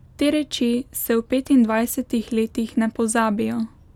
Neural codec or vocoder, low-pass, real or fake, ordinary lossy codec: none; 19.8 kHz; real; none